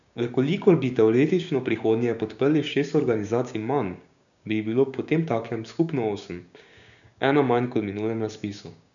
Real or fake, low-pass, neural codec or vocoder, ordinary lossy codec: fake; 7.2 kHz; codec, 16 kHz, 6 kbps, DAC; none